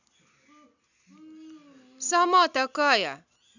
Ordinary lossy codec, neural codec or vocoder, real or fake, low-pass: none; none; real; 7.2 kHz